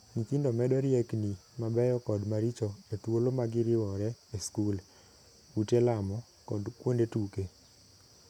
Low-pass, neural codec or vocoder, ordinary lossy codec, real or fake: 19.8 kHz; none; none; real